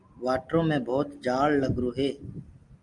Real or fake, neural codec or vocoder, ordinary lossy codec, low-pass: real; none; Opus, 32 kbps; 10.8 kHz